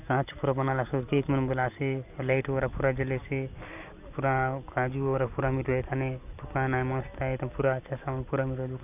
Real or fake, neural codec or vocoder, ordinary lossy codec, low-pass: real; none; none; 3.6 kHz